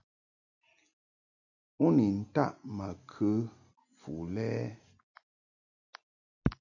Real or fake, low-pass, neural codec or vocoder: real; 7.2 kHz; none